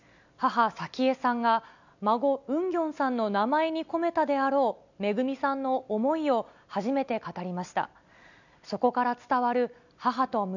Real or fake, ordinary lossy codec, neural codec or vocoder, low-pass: real; none; none; 7.2 kHz